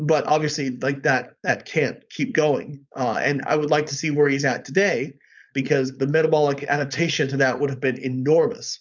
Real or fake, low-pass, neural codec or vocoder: fake; 7.2 kHz; codec, 16 kHz, 4.8 kbps, FACodec